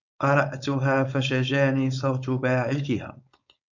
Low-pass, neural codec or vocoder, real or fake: 7.2 kHz; codec, 16 kHz, 4.8 kbps, FACodec; fake